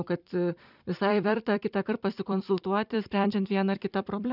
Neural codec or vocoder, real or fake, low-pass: vocoder, 44.1 kHz, 128 mel bands every 256 samples, BigVGAN v2; fake; 5.4 kHz